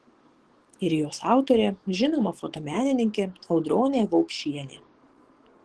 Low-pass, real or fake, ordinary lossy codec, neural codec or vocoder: 10.8 kHz; fake; Opus, 16 kbps; codec, 44.1 kHz, 7.8 kbps, DAC